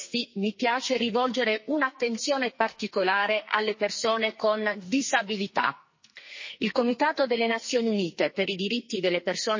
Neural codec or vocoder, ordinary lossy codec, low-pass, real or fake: codec, 44.1 kHz, 2.6 kbps, SNAC; MP3, 32 kbps; 7.2 kHz; fake